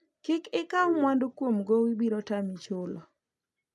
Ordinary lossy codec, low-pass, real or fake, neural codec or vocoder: none; none; real; none